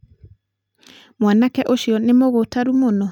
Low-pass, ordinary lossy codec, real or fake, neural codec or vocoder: 19.8 kHz; none; real; none